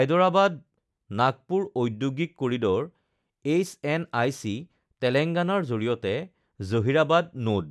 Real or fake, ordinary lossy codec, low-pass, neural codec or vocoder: real; none; none; none